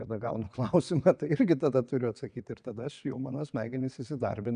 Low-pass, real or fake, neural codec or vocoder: 9.9 kHz; fake; vocoder, 22.05 kHz, 80 mel bands, WaveNeXt